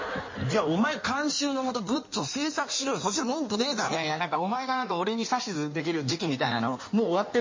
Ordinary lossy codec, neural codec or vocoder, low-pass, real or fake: MP3, 32 kbps; codec, 16 kHz in and 24 kHz out, 1.1 kbps, FireRedTTS-2 codec; 7.2 kHz; fake